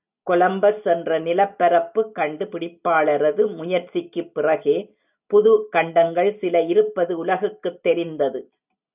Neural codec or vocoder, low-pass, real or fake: none; 3.6 kHz; real